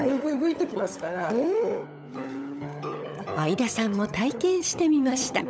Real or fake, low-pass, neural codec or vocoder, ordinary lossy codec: fake; none; codec, 16 kHz, 16 kbps, FunCodec, trained on LibriTTS, 50 frames a second; none